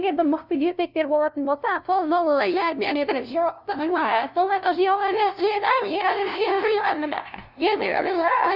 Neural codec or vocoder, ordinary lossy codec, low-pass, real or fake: codec, 16 kHz, 0.5 kbps, FunCodec, trained on LibriTTS, 25 frames a second; none; 5.4 kHz; fake